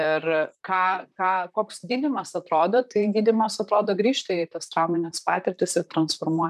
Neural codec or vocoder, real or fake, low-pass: vocoder, 44.1 kHz, 128 mel bands, Pupu-Vocoder; fake; 14.4 kHz